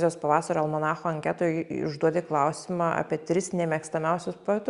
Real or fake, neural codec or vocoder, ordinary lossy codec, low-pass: real; none; Opus, 64 kbps; 10.8 kHz